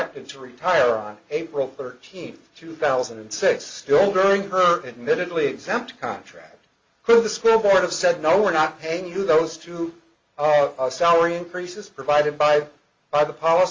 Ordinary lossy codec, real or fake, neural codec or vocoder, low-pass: Opus, 32 kbps; real; none; 7.2 kHz